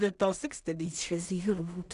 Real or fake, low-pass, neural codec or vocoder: fake; 10.8 kHz; codec, 16 kHz in and 24 kHz out, 0.4 kbps, LongCat-Audio-Codec, two codebook decoder